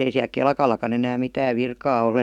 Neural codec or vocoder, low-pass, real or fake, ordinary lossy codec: codec, 44.1 kHz, 7.8 kbps, DAC; 19.8 kHz; fake; none